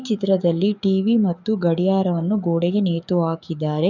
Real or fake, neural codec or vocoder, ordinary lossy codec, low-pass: fake; codec, 44.1 kHz, 7.8 kbps, DAC; none; 7.2 kHz